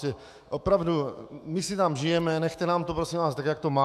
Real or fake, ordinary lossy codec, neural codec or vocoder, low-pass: fake; AAC, 96 kbps; autoencoder, 48 kHz, 128 numbers a frame, DAC-VAE, trained on Japanese speech; 14.4 kHz